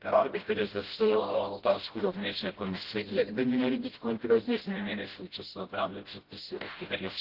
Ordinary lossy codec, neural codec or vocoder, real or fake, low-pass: Opus, 16 kbps; codec, 16 kHz, 0.5 kbps, FreqCodec, smaller model; fake; 5.4 kHz